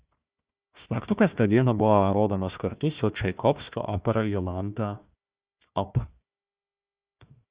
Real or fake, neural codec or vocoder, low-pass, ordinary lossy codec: fake; codec, 16 kHz, 1 kbps, FunCodec, trained on Chinese and English, 50 frames a second; 3.6 kHz; Opus, 64 kbps